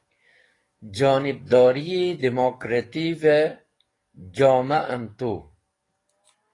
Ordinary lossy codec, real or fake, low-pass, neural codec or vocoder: AAC, 32 kbps; fake; 10.8 kHz; codec, 44.1 kHz, 7.8 kbps, DAC